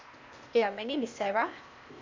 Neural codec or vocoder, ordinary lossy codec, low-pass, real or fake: codec, 16 kHz, 0.8 kbps, ZipCodec; MP3, 64 kbps; 7.2 kHz; fake